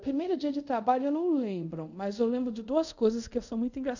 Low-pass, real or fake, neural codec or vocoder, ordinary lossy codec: 7.2 kHz; fake; codec, 24 kHz, 0.5 kbps, DualCodec; none